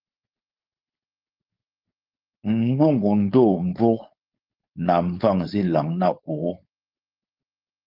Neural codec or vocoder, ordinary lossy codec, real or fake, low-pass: codec, 16 kHz, 4.8 kbps, FACodec; Opus, 32 kbps; fake; 5.4 kHz